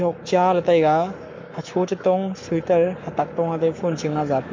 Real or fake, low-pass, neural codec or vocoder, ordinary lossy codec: fake; 7.2 kHz; codec, 44.1 kHz, 7.8 kbps, Pupu-Codec; MP3, 48 kbps